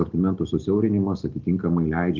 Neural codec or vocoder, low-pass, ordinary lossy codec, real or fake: none; 7.2 kHz; Opus, 32 kbps; real